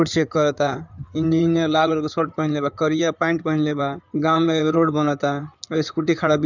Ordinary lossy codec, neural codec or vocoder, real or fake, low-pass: none; codec, 16 kHz in and 24 kHz out, 2.2 kbps, FireRedTTS-2 codec; fake; 7.2 kHz